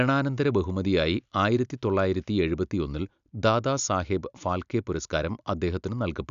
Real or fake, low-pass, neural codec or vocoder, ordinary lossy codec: real; 7.2 kHz; none; none